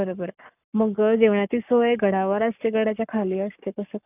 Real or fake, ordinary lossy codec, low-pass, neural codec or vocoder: fake; none; 3.6 kHz; codec, 44.1 kHz, 7.8 kbps, Pupu-Codec